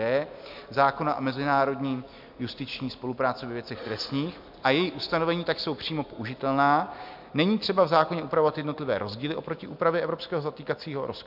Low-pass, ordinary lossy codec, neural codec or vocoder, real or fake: 5.4 kHz; MP3, 48 kbps; none; real